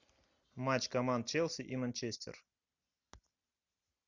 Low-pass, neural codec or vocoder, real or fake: 7.2 kHz; none; real